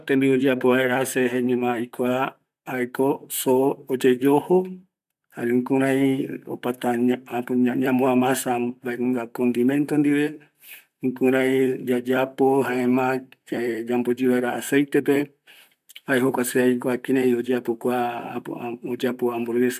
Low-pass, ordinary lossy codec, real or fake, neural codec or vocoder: 14.4 kHz; none; fake; vocoder, 44.1 kHz, 128 mel bands, Pupu-Vocoder